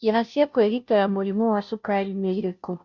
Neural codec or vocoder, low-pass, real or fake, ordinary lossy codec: codec, 16 kHz, 0.5 kbps, FunCodec, trained on Chinese and English, 25 frames a second; 7.2 kHz; fake; MP3, 64 kbps